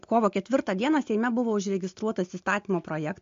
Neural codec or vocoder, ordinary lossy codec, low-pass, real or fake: none; MP3, 48 kbps; 7.2 kHz; real